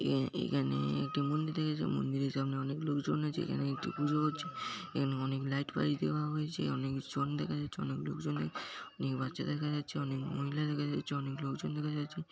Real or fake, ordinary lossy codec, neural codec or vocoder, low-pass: real; none; none; none